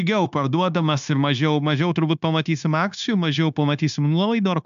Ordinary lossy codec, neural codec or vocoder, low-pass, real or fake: AAC, 96 kbps; codec, 16 kHz, 0.9 kbps, LongCat-Audio-Codec; 7.2 kHz; fake